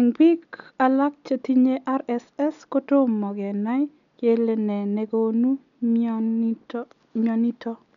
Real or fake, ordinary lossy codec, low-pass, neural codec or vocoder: real; none; 7.2 kHz; none